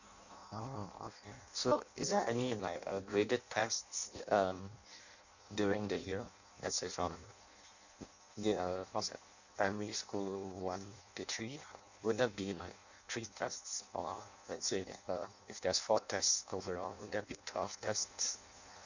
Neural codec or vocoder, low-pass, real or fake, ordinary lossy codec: codec, 16 kHz in and 24 kHz out, 0.6 kbps, FireRedTTS-2 codec; 7.2 kHz; fake; none